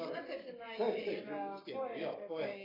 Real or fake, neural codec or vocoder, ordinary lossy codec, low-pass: fake; codec, 44.1 kHz, 7.8 kbps, Pupu-Codec; AAC, 24 kbps; 5.4 kHz